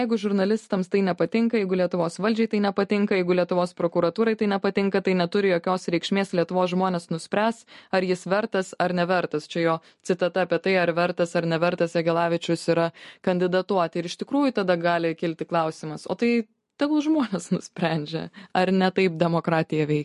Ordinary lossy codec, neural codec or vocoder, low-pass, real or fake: MP3, 48 kbps; none; 14.4 kHz; real